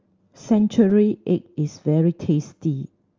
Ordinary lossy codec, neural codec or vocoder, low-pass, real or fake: Opus, 32 kbps; none; 7.2 kHz; real